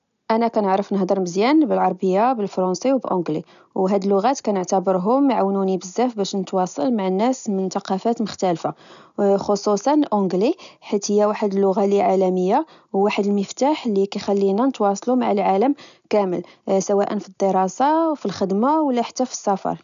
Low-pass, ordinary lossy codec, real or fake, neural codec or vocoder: 7.2 kHz; none; real; none